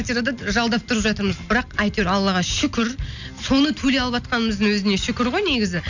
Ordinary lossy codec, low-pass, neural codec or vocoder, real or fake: none; 7.2 kHz; none; real